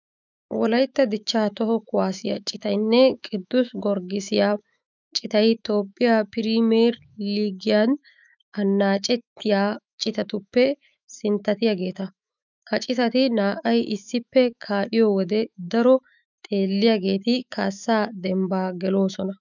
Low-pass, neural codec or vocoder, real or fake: 7.2 kHz; autoencoder, 48 kHz, 128 numbers a frame, DAC-VAE, trained on Japanese speech; fake